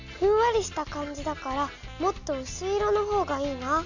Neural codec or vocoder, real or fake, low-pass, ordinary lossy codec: none; real; 7.2 kHz; none